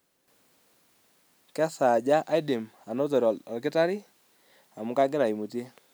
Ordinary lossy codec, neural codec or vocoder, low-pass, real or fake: none; none; none; real